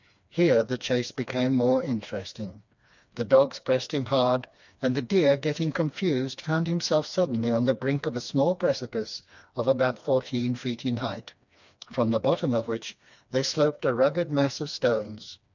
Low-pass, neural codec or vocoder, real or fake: 7.2 kHz; codec, 16 kHz, 2 kbps, FreqCodec, smaller model; fake